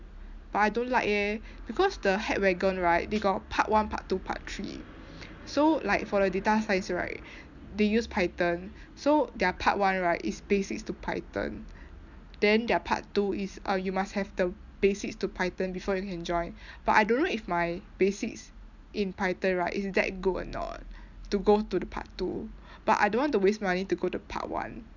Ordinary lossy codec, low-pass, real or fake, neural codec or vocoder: none; 7.2 kHz; real; none